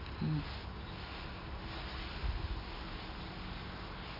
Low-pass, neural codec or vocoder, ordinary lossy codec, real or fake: 5.4 kHz; none; none; real